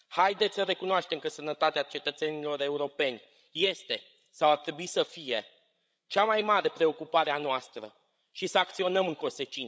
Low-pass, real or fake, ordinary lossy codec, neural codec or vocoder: none; fake; none; codec, 16 kHz, 16 kbps, FreqCodec, larger model